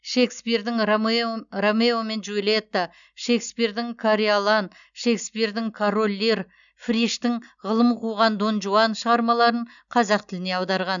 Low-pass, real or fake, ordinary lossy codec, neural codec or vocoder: 7.2 kHz; real; none; none